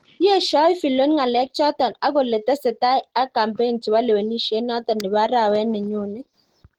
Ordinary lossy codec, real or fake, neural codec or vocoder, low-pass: Opus, 16 kbps; real; none; 19.8 kHz